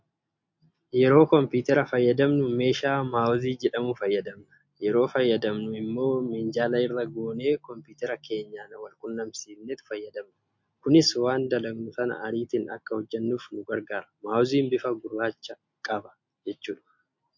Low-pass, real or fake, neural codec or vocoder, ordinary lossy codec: 7.2 kHz; real; none; MP3, 48 kbps